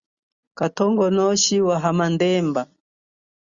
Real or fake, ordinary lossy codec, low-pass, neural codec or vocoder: real; Opus, 64 kbps; 7.2 kHz; none